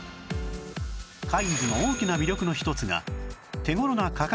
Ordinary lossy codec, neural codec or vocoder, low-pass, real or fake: none; none; none; real